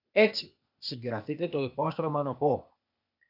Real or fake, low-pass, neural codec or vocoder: fake; 5.4 kHz; codec, 16 kHz, 0.8 kbps, ZipCodec